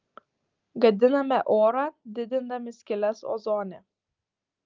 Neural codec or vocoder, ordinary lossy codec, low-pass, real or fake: none; Opus, 24 kbps; 7.2 kHz; real